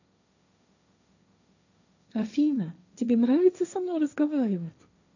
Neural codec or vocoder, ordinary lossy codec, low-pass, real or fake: codec, 16 kHz, 1.1 kbps, Voila-Tokenizer; none; 7.2 kHz; fake